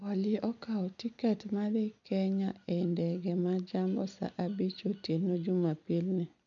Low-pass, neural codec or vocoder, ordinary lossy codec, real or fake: 7.2 kHz; none; none; real